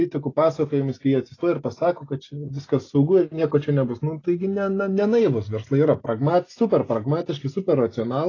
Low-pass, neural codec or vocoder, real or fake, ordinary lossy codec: 7.2 kHz; none; real; AAC, 32 kbps